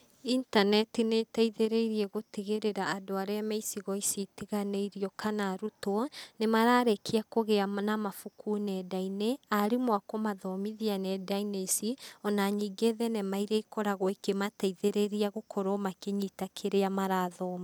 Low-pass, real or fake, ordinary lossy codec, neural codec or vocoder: none; real; none; none